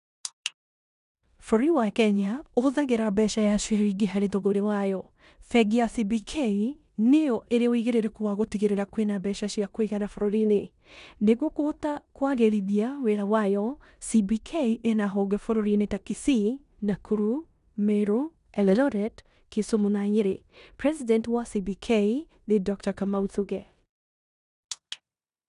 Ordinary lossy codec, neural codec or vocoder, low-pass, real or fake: none; codec, 16 kHz in and 24 kHz out, 0.9 kbps, LongCat-Audio-Codec, four codebook decoder; 10.8 kHz; fake